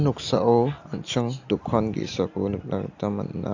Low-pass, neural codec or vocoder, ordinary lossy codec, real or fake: 7.2 kHz; none; AAC, 48 kbps; real